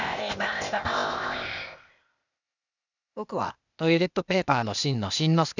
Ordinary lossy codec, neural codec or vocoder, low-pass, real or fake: none; codec, 16 kHz, 0.8 kbps, ZipCodec; 7.2 kHz; fake